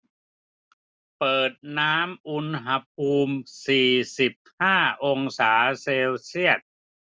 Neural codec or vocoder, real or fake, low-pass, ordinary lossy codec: none; real; none; none